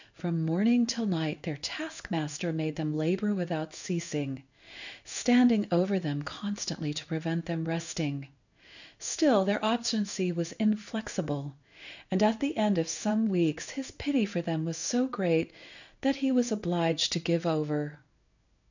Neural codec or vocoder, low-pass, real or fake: codec, 16 kHz in and 24 kHz out, 1 kbps, XY-Tokenizer; 7.2 kHz; fake